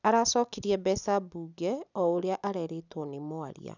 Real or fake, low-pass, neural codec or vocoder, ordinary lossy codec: real; 7.2 kHz; none; none